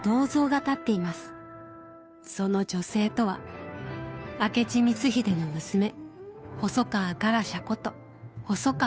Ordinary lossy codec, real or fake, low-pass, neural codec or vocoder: none; fake; none; codec, 16 kHz, 2 kbps, FunCodec, trained on Chinese and English, 25 frames a second